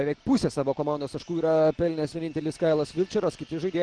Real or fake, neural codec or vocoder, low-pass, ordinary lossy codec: real; none; 9.9 kHz; Opus, 16 kbps